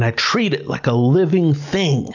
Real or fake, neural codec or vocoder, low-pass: real; none; 7.2 kHz